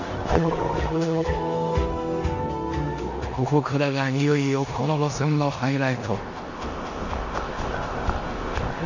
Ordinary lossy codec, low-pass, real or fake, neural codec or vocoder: none; 7.2 kHz; fake; codec, 16 kHz in and 24 kHz out, 0.9 kbps, LongCat-Audio-Codec, four codebook decoder